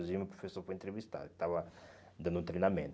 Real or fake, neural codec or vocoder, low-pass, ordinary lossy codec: real; none; none; none